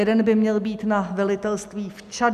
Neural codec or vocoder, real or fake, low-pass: none; real; 14.4 kHz